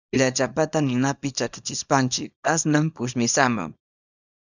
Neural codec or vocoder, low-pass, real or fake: codec, 24 kHz, 0.9 kbps, WavTokenizer, small release; 7.2 kHz; fake